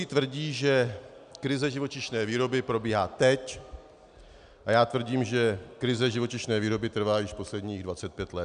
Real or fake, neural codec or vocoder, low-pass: real; none; 9.9 kHz